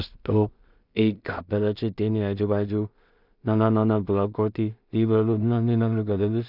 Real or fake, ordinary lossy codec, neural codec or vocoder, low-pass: fake; none; codec, 16 kHz in and 24 kHz out, 0.4 kbps, LongCat-Audio-Codec, two codebook decoder; 5.4 kHz